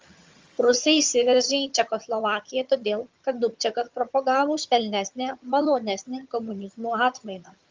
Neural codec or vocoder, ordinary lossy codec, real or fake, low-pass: vocoder, 22.05 kHz, 80 mel bands, HiFi-GAN; Opus, 32 kbps; fake; 7.2 kHz